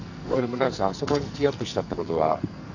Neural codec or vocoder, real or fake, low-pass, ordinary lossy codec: codec, 44.1 kHz, 2.6 kbps, SNAC; fake; 7.2 kHz; none